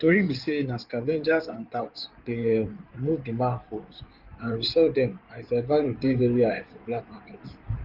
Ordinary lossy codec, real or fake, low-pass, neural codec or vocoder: Opus, 32 kbps; fake; 5.4 kHz; codec, 16 kHz in and 24 kHz out, 2.2 kbps, FireRedTTS-2 codec